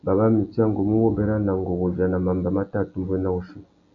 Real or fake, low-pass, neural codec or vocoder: real; 7.2 kHz; none